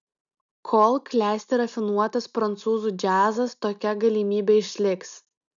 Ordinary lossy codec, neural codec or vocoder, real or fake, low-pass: MP3, 96 kbps; none; real; 7.2 kHz